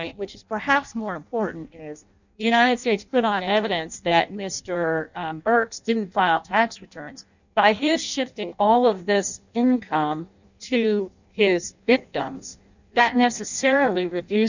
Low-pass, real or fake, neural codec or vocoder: 7.2 kHz; fake; codec, 16 kHz in and 24 kHz out, 0.6 kbps, FireRedTTS-2 codec